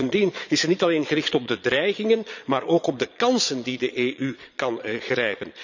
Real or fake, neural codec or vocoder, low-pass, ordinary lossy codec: fake; vocoder, 22.05 kHz, 80 mel bands, Vocos; 7.2 kHz; none